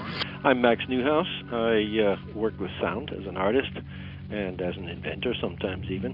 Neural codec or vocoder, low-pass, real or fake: none; 5.4 kHz; real